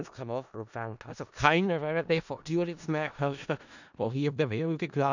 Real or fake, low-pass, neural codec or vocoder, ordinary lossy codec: fake; 7.2 kHz; codec, 16 kHz in and 24 kHz out, 0.4 kbps, LongCat-Audio-Codec, four codebook decoder; none